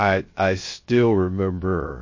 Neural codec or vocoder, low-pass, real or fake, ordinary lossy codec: codec, 16 kHz, 0.3 kbps, FocalCodec; 7.2 kHz; fake; MP3, 32 kbps